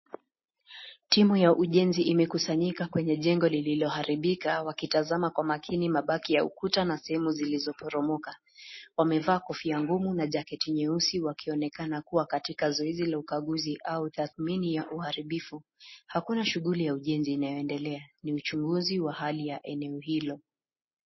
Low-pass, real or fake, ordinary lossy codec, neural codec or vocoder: 7.2 kHz; real; MP3, 24 kbps; none